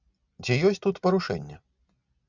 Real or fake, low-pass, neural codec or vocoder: real; 7.2 kHz; none